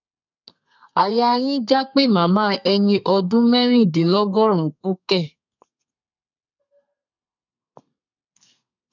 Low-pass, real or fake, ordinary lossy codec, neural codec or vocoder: 7.2 kHz; fake; none; codec, 32 kHz, 1.9 kbps, SNAC